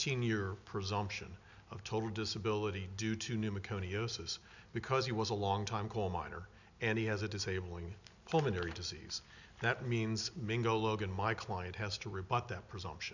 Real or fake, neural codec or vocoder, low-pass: real; none; 7.2 kHz